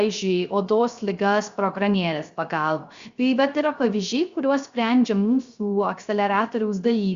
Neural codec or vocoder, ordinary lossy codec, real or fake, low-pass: codec, 16 kHz, 0.3 kbps, FocalCodec; Opus, 64 kbps; fake; 7.2 kHz